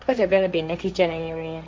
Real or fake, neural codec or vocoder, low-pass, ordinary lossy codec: fake; codec, 16 kHz, 1.1 kbps, Voila-Tokenizer; none; none